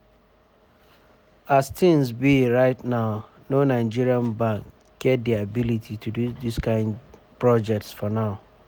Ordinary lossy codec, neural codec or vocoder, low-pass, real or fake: none; none; none; real